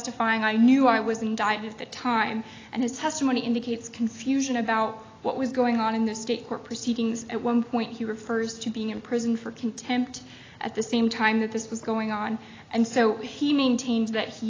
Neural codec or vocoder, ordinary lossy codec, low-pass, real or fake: none; AAC, 32 kbps; 7.2 kHz; real